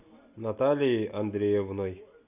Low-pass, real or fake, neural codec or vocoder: 3.6 kHz; real; none